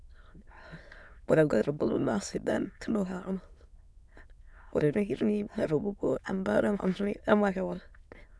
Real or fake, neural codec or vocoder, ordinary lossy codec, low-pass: fake; autoencoder, 22.05 kHz, a latent of 192 numbers a frame, VITS, trained on many speakers; none; none